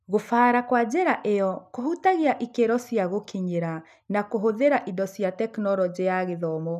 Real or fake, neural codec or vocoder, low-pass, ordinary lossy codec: real; none; 14.4 kHz; none